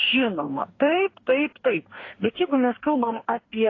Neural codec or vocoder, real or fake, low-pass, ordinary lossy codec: codec, 44.1 kHz, 2.6 kbps, DAC; fake; 7.2 kHz; AAC, 32 kbps